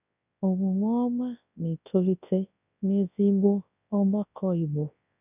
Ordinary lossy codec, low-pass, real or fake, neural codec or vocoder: none; 3.6 kHz; fake; codec, 24 kHz, 0.9 kbps, WavTokenizer, large speech release